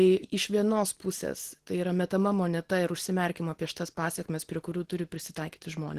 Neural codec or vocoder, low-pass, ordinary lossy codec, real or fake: none; 14.4 kHz; Opus, 16 kbps; real